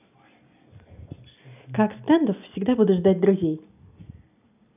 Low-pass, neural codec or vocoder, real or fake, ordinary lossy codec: 3.6 kHz; none; real; none